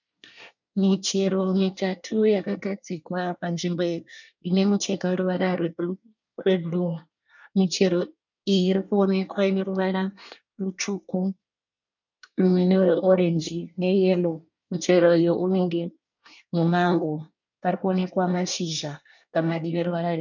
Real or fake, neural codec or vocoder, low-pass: fake; codec, 24 kHz, 1 kbps, SNAC; 7.2 kHz